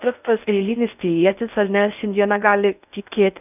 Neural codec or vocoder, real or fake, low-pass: codec, 16 kHz in and 24 kHz out, 0.6 kbps, FocalCodec, streaming, 4096 codes; fake; 3.6 kHz